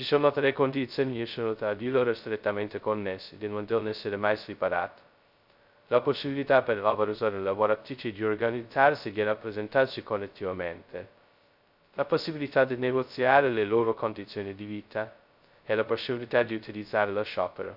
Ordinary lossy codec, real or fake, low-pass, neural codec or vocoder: none; fake; 5.4 kHz; codec, 16 kHz, 0.2 kbps, FocalCodec